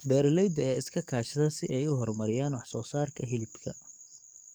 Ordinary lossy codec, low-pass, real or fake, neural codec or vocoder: none; none; fake; codec, 44.1 kHz, 7.8 kbps, DAC